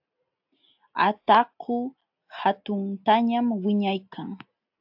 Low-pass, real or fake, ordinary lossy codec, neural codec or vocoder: 5.4 kHz; real; AAC, 48 kbps; none